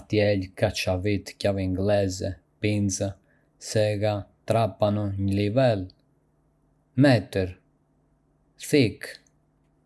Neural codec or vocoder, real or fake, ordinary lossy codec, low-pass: none; real; none; none